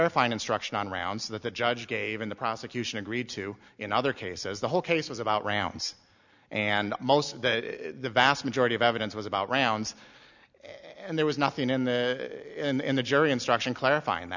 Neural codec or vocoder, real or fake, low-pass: none; real; 7.2 kHz